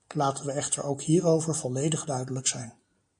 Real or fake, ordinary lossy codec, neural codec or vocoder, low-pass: real; MP3, 48 kbps; none; 9.9 kHz